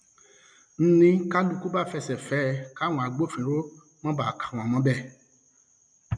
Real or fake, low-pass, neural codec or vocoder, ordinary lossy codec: real; 9.9 kHz; none; none